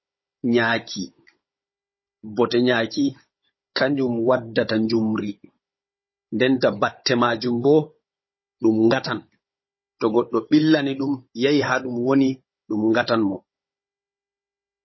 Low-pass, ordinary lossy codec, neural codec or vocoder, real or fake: 7.2 kHz; MP3, 24 kbps; codec, 16 kHz, 16 kbps, FunCodec, trained on Chinese and English, 50 frames a second; fake